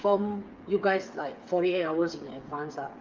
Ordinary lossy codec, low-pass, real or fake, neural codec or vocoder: Opus, 24 kbps; 7.2 kHz; fake; codec, 16 kHz, 8 kbps, FreqCodec, smaller model